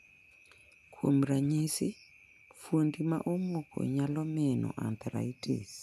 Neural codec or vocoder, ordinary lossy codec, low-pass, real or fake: none; none; 14.4 kHz; real